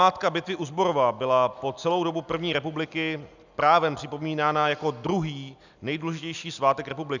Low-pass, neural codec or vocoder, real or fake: 7.2 kHz; none; real